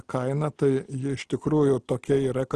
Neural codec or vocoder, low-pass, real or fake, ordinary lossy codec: none; 9.9 kHz; real; Opus, 16 kbps